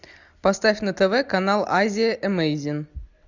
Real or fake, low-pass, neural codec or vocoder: real; 7.2 kHz; none